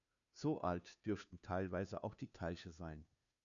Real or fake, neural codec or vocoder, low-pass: fake; codec, 16 kHz, 2 kbps, FunCodec, trained on Chinese and English, 25 frames a second; 7.2 kHz